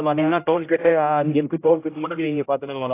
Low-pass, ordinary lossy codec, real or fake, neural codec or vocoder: 3.6 kHz; AAC, 24 kbps; fake; codec, 16 kHz, 0.5 kbps, X-Codec, HuBERT features, trained on general audio